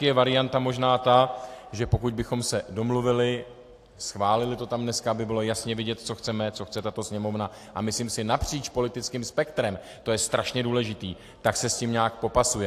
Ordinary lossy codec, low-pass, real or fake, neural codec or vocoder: AAC, 64 kbps; 14.4 kHz; real; none